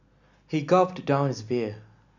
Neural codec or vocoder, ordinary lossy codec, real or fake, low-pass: none; none; real; 7.2 kHz